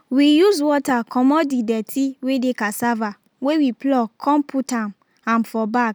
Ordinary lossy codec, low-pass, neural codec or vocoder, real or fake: none; none; none; real